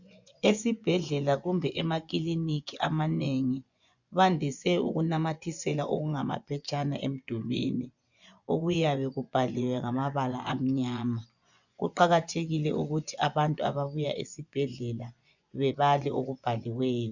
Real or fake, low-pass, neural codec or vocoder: fake; 7.2 kHz; vocoder, 24 kHz, 100 mel bands, Vocos